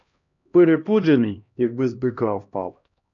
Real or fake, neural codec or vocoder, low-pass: fake; codec, 16 kHz, 1 kbps, X-Codec, HuBERT features, trained on LibriSpeech; 7.2 kHz